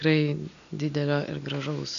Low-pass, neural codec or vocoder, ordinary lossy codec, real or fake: 7.2 kHz; none; MP3, 96 kbps; real